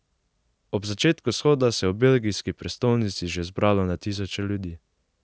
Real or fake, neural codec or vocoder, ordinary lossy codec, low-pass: real; none; none; none